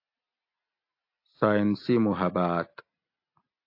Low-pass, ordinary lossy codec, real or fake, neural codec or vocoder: 5.4 kHz; AAC, 32 kbps; real; none